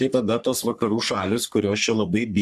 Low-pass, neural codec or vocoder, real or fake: 14.4 kHz; codec, 44.1 kHz, 3.4 kbps, Pupu-Codec; fake